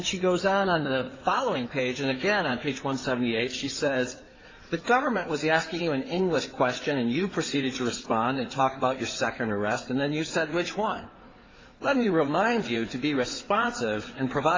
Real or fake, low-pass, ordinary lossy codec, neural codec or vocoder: fake; 7.2 kHz; AAC, 32 kbps; codec, 16 kHz in and 24 kHz out, 2.2 kbps, FireRedTTS-2 codec